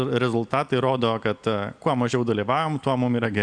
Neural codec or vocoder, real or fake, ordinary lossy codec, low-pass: none; real; Opus, 64 kbps; 9.9 kHz